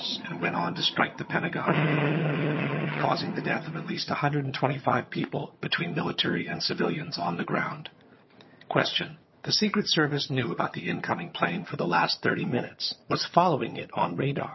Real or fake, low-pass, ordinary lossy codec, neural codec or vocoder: fake; 7.2 kHz; MP3, 24 kbps; vocoder, 22.05 kHz, 80 mel bands, HiFi-GAN